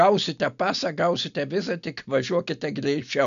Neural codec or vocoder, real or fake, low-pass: none; real; 7.2 kHz